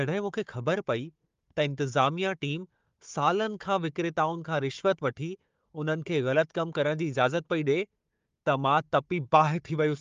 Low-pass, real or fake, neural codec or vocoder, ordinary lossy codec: 7.2 kHz; fake; codec, 16 kHz, 4 kbps, FunCodec, trained on Chinese and English, 50 frames a second; Opus, 32 kbps